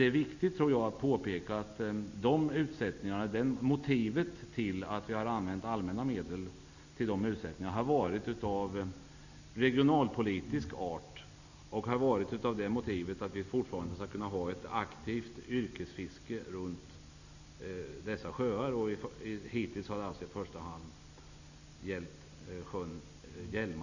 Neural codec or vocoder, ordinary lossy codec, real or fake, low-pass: none; none; real; 7.2 kHz